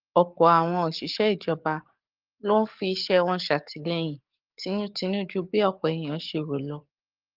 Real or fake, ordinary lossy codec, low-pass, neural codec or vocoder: fake; Opus, 32 kbps; 5.4 kHz; codec, 44.1 kHz, 7.8 kbps, DAC